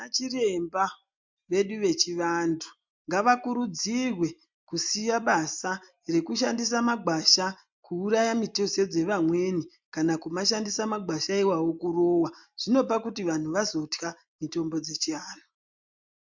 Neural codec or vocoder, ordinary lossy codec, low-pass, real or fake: none; MP3, 64 kbps; 7.2 kHz; real